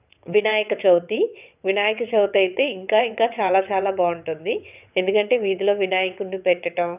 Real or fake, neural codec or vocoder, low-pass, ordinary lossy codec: fake; vocoder, 22.05 kHz, 80 mel bands, WaveNeXt; 3.6 kHz; none